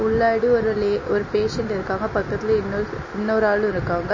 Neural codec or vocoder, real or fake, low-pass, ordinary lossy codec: none; real; 7.2 kHz; MP3, 32 kbps